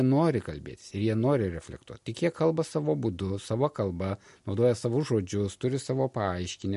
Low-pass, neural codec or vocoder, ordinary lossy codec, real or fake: 14.4 kHz; none; MP3, 48 kbps; real